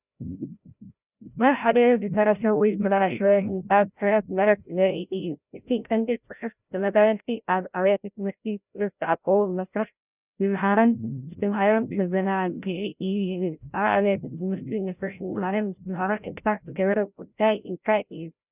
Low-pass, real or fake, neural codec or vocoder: 3.6 kHz; fake; codec, 16 kHz, 0.5 kbps, FreqCodec, larger model